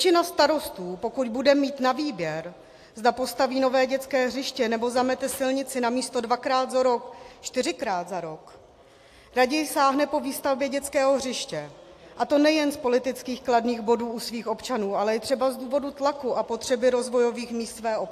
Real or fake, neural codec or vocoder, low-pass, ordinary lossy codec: real; none; 14.4 kHz; AAC, 64 kbps